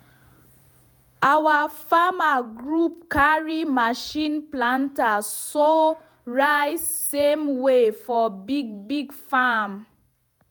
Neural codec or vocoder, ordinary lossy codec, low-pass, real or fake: vocoder, 48 kHz, 128 mel bands, Vocos; none; none; fake